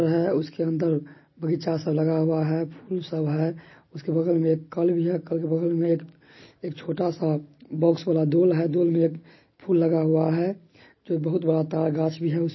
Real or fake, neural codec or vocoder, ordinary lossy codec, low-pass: real; none; MP3, 24 kbps; 7.2 kHz